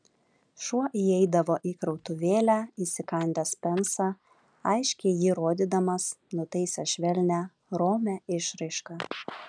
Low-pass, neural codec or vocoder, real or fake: 9.9 kHz; none; real